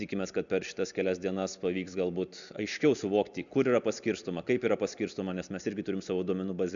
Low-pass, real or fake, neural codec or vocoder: 7.2 kHz; real; none